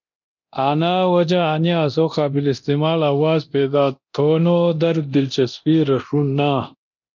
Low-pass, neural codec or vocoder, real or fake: 7.2 kHz; codec, 24 kHz, 0.9 kbps, DualCodec; fake